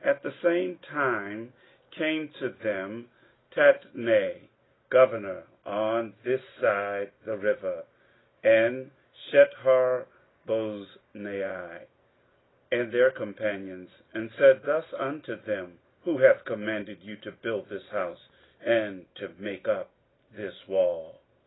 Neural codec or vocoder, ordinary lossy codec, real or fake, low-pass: none; AAC, 16 kbps; real; 7.2 kHz